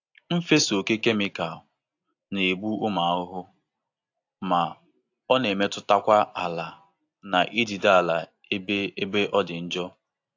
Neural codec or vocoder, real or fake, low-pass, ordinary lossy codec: none; real; 7.2 kHz; AAC, 48 kbps